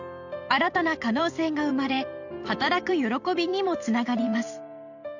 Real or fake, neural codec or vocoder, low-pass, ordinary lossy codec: fake; vocoder, 44.1 kHz, 128 mel bands every 512 samples, BigVGAN v2; 7.2 kHz; none